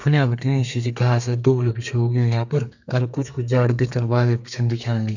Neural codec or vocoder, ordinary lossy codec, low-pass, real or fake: codec, 32 kHz, 1.9 kbps, SNAC; none; 7.2 kHz; fake